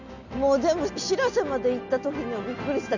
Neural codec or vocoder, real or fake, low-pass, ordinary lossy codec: none; real; 7.2 kHz; none